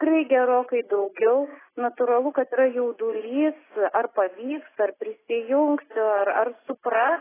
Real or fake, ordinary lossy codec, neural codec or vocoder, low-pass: real; AAC, 16 kbps; none; 3.6 kHz